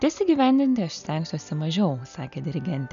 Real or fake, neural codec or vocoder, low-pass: real; none; 7.2 kHz